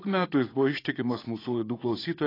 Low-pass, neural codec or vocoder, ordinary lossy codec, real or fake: 5.4 kHz; vocoder, 44.1 kHz, 80 mel bands, Vocos; AAC, 24 kbps; fake